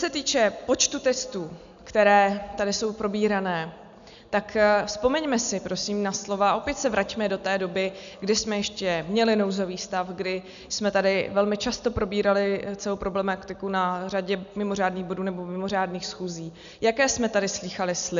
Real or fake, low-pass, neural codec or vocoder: real; 7.2 kHz; none